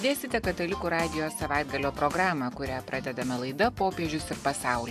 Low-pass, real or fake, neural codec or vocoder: 14.4 kHz; real; none